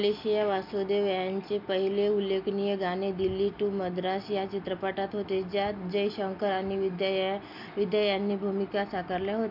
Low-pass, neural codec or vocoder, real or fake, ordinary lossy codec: 5.4 kHz; none; real; none